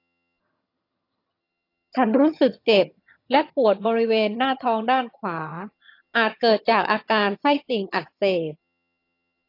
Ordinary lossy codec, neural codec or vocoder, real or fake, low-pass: AAC, 32 kbps; vocoder, 22.05 kHz, 80 mel bands, HiFi-GAN; fake; 5.4 kHz